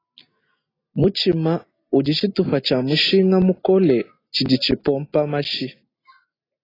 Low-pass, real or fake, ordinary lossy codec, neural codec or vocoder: 5.4 kHz; real; AAC, 24 kbps; none